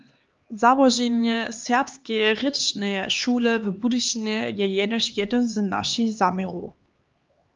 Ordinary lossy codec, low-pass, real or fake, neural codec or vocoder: Opus, 32 kbps; 7.2 kHz; fake; codec, 16 kHz, 2 kbps, X-Codec, HuBERT features, trained on LibriSpeech